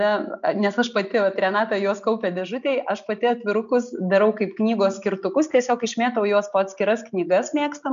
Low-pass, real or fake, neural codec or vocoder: 7.2 kHz; real; none